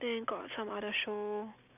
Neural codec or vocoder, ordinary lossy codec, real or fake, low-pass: none; none; real; 3.6 kHz